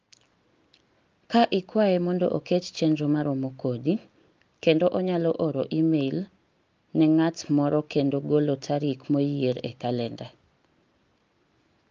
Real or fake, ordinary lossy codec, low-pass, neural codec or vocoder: real; Opus, 24 kbps; 7.2 kHz; none